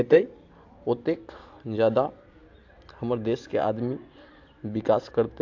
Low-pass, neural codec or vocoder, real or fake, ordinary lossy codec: 7.2 kHz; none; real; AAC, 48 kbps